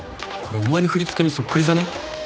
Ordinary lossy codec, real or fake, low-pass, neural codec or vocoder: none; fake; none; codec, 16 kHz, 2 kbps, X-Codec, HuBERT features, trained on general audio